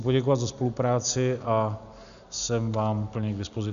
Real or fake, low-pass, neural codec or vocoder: real; 7.2 kHz; none